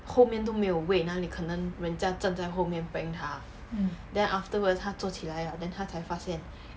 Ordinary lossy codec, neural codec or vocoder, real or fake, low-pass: none; none; real; none